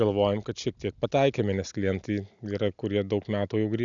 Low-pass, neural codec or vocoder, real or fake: 7.2 kHz; none; real